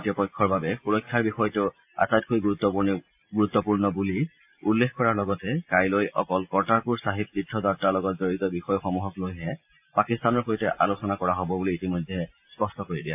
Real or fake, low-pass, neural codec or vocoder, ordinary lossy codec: real; 3.6 kHz; none; AAC, 32 kbps